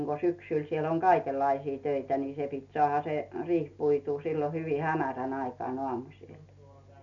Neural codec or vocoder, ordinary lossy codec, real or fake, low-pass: none; none; real; 7.2 kHz